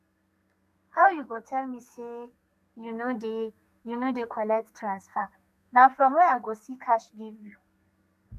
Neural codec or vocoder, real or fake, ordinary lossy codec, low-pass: codec, 32 kHz, 1.9 kbps, SNAC; fake; none; 14.4 kHz